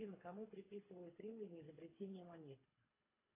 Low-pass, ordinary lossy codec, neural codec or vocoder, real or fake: 3.6 kHz; AAC, 24 kbps; codec, 24 kHz, 3 kbps, HILCodec; fake